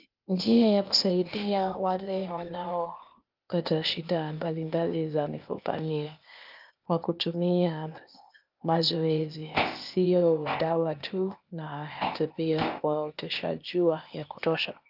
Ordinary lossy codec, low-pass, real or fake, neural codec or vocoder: Opus, 24 kbps; 5.4 kHz; fake; codec, 16 kHz, 0.8 kbps, ZipCodec